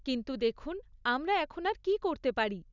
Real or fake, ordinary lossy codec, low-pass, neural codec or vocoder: real; none; 7.2 kHz; none